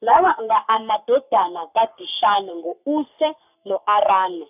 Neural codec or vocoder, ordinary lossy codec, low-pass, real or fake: codec, 44.1 kHz, 3.4 kbps, Pupu-Codec; none; 3.6 kHz; fake